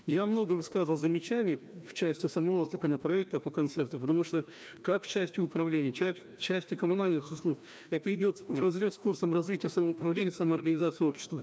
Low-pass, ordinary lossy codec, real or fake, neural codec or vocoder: none; none; fake; codec, 16 kHz, 1 kbps, FreqCodec, larger model